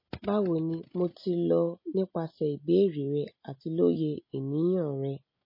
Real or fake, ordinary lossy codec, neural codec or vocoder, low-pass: real; MP3, 24 kbps; none; 5.4 kHz